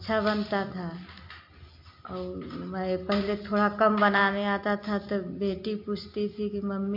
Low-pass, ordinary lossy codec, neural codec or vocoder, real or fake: 5.4 kHz; MP3, 48 kbps; none; real